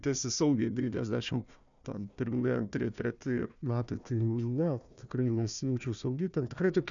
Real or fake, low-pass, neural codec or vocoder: fake; 7.2 kHz; codec, 16 kHz, 1 kbps, FunCodec, trained on Chinese and English, 50 frames a second